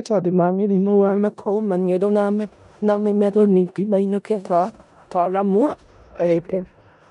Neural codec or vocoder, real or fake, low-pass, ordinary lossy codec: codec, 16 kHz in and 24 kHz out, 0.4 kbps, LongCat-Audio-Codec, four codebook decoder; fake; 10.8 kHz; none